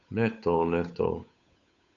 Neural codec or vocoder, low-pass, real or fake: codec, 16 kHz, 8 kbps, FunCodec, trained on Chinese and English, 25 frames a second; 7.2 kHz; fake